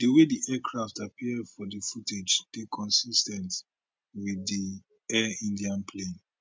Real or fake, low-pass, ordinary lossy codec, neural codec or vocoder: real; none; none; none